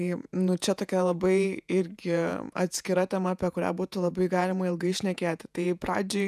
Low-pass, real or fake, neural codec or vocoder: 14.4 kHz; fake; vocoder, 48 kHz, 128 mel bands, Vocos